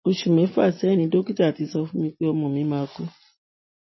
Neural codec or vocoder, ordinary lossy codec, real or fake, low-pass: none; MP3, 24 kbps; real; 7.2 kHz